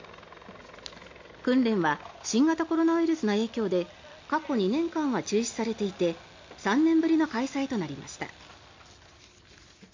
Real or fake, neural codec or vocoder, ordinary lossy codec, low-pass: real; none; MP3, 64 kbps; 7.2 kHz